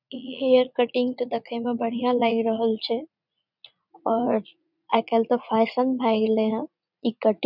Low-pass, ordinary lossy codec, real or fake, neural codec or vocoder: 5.4 kHz; none; fake; vocoder, 44.1 kHz, 80 mel bands, Vocos